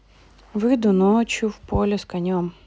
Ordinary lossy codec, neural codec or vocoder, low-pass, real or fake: none; none; none; real